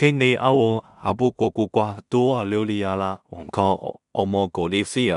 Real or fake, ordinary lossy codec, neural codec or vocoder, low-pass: fake; none; codec, 16 kHz in and 24 kHz out, 0.4 kbps, LongCat-Audio-Codec, two codebook decoder; 10.8 kHz